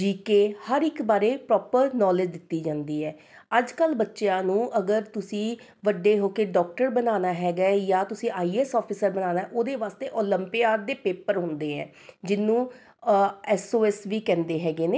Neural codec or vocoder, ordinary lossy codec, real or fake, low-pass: none; none; real; none